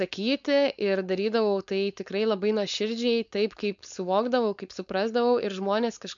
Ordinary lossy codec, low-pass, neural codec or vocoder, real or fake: MP3, 64 kbps; 7.2 kHz; codec, 16 kHz, 4.8 kbps, FACodec; fake